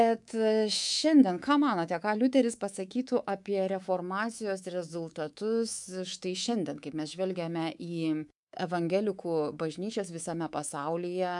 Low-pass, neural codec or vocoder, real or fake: 10.8 kHz; codec, 24 kHz, 3.1 kbps, DualCodec; fake